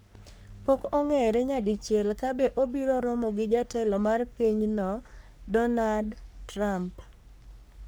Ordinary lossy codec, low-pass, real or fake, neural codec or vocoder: none; none; fake; codec, 44.1 kHz, 3.4 kbps, Pupu-Codec